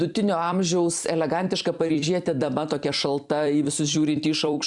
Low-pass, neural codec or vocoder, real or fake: 10.8 kHz; vocoder, 44.1 kHz, 128 mel bands every 256 samples, BigVGAN v2; fake